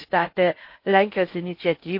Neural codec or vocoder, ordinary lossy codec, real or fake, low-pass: codec, 16 kHz in and 24 kHz out, 0.6 kbps, FocalCodec, streaming, 2048 codes; MP3, 32 kbps; fake; 5.4 kHz